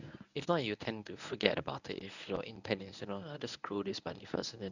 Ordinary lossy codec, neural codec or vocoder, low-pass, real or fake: none; codec, 24 kHz, 0.9 kbps, WavTokenizer, medium speech release version 1; 7.2 kHz; fake